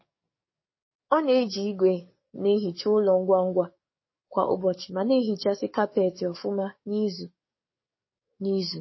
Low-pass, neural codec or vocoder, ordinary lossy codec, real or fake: 7.2 kHz; codec, 44.1 kHz, 7.8 kbps, DAC; MP3, 24 kbps; fake